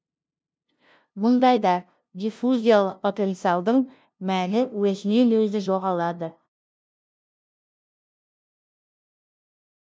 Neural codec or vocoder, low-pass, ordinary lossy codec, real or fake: codec, 16 kHz, 0.5 kbps, FunCodec, trained on LibriTTS, 25 frames a second; none; none; fake